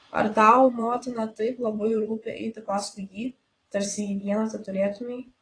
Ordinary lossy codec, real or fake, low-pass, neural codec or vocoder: AAC, 32 kbps; fake; 9.9 kHz; vocoder, 22.05 kHz, 80 mel bands, WaveNeXt